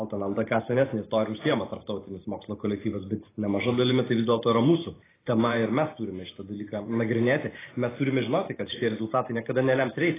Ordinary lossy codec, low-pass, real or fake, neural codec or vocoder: AAC, 16 kbps; 3.6 kHz; fake; vocoder, 44.1 kHz, 128 mel bands every 512 samples, BigVGAN v2